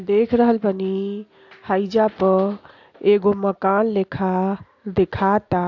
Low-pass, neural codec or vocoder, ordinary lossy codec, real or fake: 7.2 kHz; none; AAC, 48 kbps; real